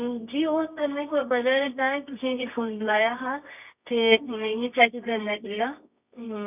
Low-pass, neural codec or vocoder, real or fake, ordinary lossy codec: 3.6 kHz; codec, 24 kHz, 0.9 kbps, WavTokenizer, medium music audio release; fake; none